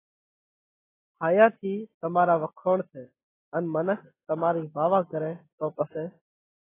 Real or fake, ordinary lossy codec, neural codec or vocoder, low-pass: real; AAC, 16 kbps; none; 3.6 kHz